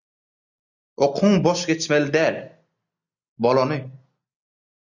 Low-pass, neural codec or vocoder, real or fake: 7.2 kHz; none; real